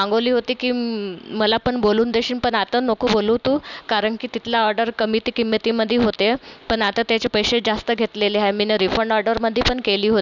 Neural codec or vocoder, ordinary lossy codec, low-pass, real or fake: none; none; 7.2 kHz; real